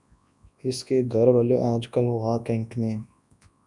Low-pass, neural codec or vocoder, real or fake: 10.8 kHz; codec, 24 kHz, 0.9 kbps, WavTokenizer, large speech release; fake